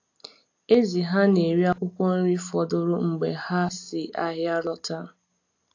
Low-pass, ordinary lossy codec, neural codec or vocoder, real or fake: 7.2 kHz; AAC, 48 kbps; none; real